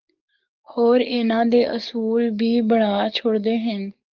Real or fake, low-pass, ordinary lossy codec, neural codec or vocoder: fake; 7.2 kHz; Opus, 24 kbps; codec, 44.1 kHz, 7.8 kbps, DAC